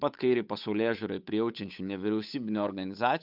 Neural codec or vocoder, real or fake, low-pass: codec, 16 kHz, 8 kbps, FunCodec, trained on Chinese and English, 25 frames a second; fake; 5.4 kHz